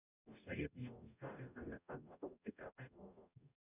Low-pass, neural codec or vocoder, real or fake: 3.6 kHz; codec, 44.1 kHz, 0.9 kbps, DAC; fake